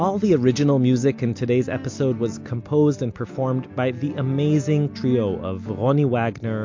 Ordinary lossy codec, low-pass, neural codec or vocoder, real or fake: MP3, 48 kbps; 7.2 kHz; none; real